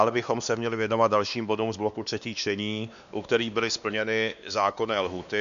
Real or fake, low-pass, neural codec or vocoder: fake; 7.2 kHz; codec, 16 kHz, 2 kbps, X-Codec, WavLM features, trained on Multilingual LibriSpeech